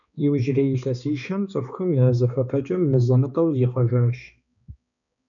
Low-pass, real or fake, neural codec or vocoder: 7.2 kHz; fake; codec, 16 kHz, 2 kbps, X-Codec, HuBERT features, trained on balanced general audio